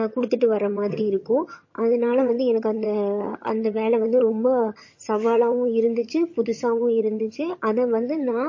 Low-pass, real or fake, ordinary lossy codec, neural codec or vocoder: 7.2 kHz; fake; MP3, 32 kbps; vocoder, 22.05 kHz, 80 mel bands, HiFi-GAN